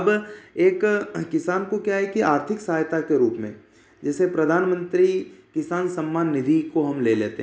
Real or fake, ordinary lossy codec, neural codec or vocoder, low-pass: real; none; none; none